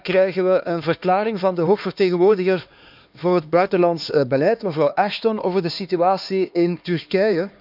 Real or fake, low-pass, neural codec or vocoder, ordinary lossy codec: fake; 5.4 kHz; codec, 16 kHz, 2 kbps, X-Codec, HuBERT features, trained on LibriSpeech; none